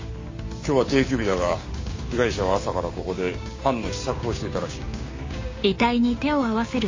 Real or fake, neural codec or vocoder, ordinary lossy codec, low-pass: fake; codec, 16 kHz, 6 kbps, DAC; MP3, 32 kbps; 7.2 kHz